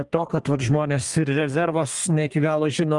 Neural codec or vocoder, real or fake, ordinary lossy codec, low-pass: codec, 32 kHz, 1.9 kbps, SNAC; fake; Opus, 32 kbps; 10.8 kHz